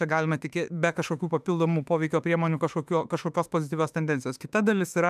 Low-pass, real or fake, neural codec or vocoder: 14.4 kHz; fake; autoencoder, 48 kHz, 32 numbers a frame, DAC-VAE, trained on Japanese speech